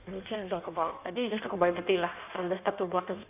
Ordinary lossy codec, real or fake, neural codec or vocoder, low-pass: none; fake; codec, 16 kHz in and 24 kHz out, 1.1 kbps, FireRedTTS-2 codec; 3.6 kHz